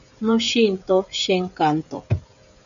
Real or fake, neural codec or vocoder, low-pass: fake; codec, 16 kHz, 16 kbps, FreqCodec, smaller model; 7.2 kHz